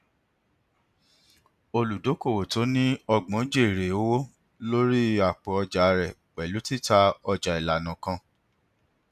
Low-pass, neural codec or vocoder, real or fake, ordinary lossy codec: 14.4 kHz; none; real; none